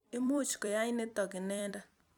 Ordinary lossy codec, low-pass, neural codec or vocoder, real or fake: none; 19.8 kHz; vocoder, 44.1 kHz, 128 mel bands every 256 samples, BigVGAN v2; fake